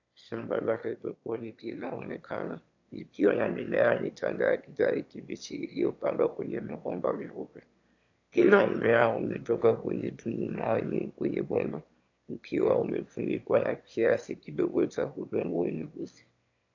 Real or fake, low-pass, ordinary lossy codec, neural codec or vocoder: fake; 7.2 kHz; AAC, 48 kbps; autoencoder, 22.05 kHz, a latent of 192 numbers a frame, VITS, trained on one speaker